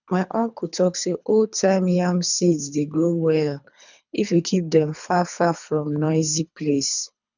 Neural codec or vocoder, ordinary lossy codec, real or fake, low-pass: codec, 24 kHz, 3 kbps, HILCodec; none; fake; 7.2 kHz